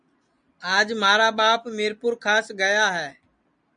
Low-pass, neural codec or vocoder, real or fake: 9.9 kHz; none; real